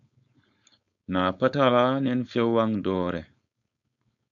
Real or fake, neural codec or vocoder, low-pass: fake; codec, 16 kHz, 4.8 kbps, FACodec; 7.2 kHz